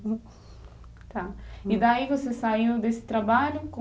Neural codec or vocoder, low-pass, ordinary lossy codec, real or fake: none; none; none; real